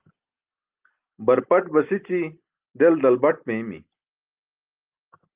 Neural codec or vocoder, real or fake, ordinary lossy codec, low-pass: none; real; Opus, 32 kbps; 3.6 kHz